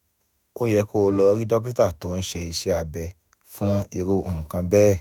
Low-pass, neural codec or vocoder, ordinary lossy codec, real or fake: 19.8 kHz; autoencoder, 48 kHz, 32 numbers a frame, DAC-VAE, trained on Japanese speech; none; fake